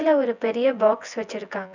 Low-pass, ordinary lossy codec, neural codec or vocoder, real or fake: 7.2 kHz; none; vocoder, 24 kHz, 100 mel bands, Vocos; fake